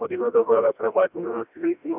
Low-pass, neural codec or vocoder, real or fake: 3.6 kHz; codec, 16 kHz, 1 kbps, FreqCodec, smaller model; fake